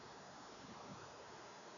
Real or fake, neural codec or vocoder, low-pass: fake; codec, 16 kHz, 4 kbps, X-Codec, WavLM features, trained on Multilingual LibriSpeech; 7.2 kHz